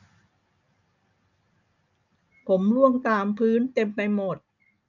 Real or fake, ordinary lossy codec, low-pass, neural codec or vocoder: real; none; 7.2 kHz; none